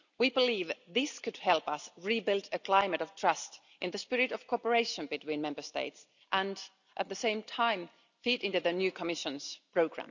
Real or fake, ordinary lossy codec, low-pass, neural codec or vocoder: real; none; 7.2 kHz; none